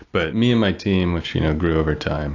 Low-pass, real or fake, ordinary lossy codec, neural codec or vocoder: 7.2 kHz; real; AAC, 48 kbps; none